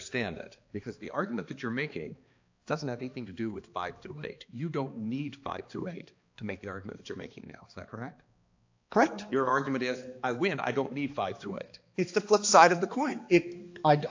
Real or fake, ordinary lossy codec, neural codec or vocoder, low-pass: fake; AAC, 48 kbps; codec, 16 kHz, 2 kbps, X-Codec, HuBERT features, trained on balanced general audio; 7.2 kHz